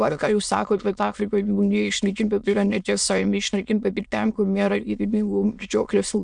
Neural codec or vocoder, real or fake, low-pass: autoencoder, 22.05 kHz, a latent of 192 numbers a frame, VITS, trained on many speakers; fake; 9.9 kHz